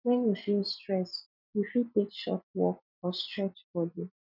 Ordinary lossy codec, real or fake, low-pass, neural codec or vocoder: none; fake; 5.4 kHz; vocoder, 44.1 kHz, 128 mel bands every 256 samples, BigVGAN v2